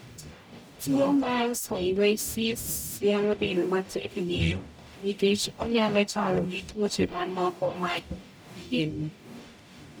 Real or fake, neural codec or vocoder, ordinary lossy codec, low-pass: fake; codec, 44.1 kHz, 0.9 kbps, DAC; none; none